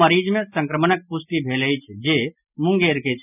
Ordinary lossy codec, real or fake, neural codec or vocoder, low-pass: none; real; none; 3.6 kHz